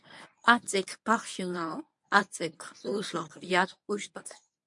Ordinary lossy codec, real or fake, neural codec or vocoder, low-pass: MP3, 64 kbps; fake; codec, 24 kHz, 0.9 kbps, WavTokenizer, medium speech release version 1; 10.8 kHz